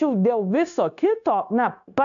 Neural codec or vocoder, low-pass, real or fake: codec, 16 kHz, 0.9 kbps, LongCat-Audio-Codec; 7.2 kHz; fake